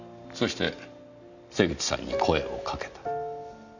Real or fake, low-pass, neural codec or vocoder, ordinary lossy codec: real; 7.2 kHz; none; none